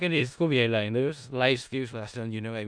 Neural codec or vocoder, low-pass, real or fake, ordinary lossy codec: codec, 16 kHz in and 24 kHz out, 0.4 kbps, LongCat-Audio-Codec, four codebook decoder; 9.9 kHz; fake; none